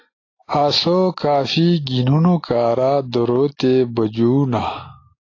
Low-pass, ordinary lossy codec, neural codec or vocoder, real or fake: 7.2 kHz; AAC, 32 kbps; none; real